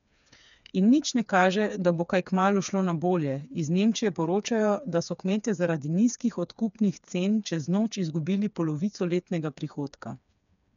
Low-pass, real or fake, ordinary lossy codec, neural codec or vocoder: 7.2 kHz; fake; MP3, 96 kbps; codec, 16 kHz, 4 kbps, FreqCodec, smaller model